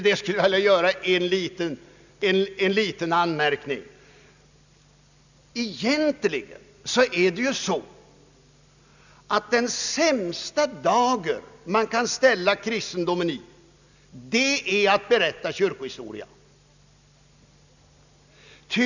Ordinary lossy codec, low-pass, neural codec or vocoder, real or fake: none; 7.2 kHz; none; real